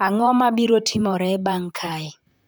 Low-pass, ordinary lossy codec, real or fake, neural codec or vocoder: none; none; fake; vocoder, 44.1 kHz, 128 mel bands, Pupu-Vocoder